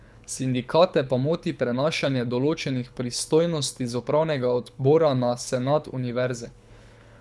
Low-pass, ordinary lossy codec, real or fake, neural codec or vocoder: none; none; fake; codec, 24 kHz, 6 kbps, HILCodec